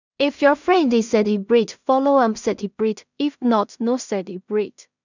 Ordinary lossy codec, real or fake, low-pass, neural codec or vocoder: none; fake; 7.2 kHz; codec, 16 kHz in and 24 kHz out, 0.4 kbps, LongCat-Audio-Codec, two codebook decoder